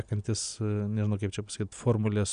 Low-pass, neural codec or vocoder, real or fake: 9.9 kHz; none; real